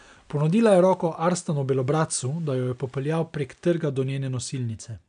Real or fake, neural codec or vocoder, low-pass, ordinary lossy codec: real; none; 9.9 kHz; none